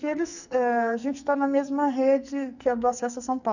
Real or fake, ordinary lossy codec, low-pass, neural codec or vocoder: fake; none; 7.2 kHz; codec, 44.1 kHz, 2.6 kbps, SNAC